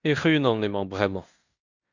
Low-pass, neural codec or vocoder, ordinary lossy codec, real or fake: 7.2 kHz; codec, 16 kHz in and 24 kHz out, 0.9 kbps, LongCat-Audio-Codec, fine tuned four codebook decoder; Opus, 64 kbps; fake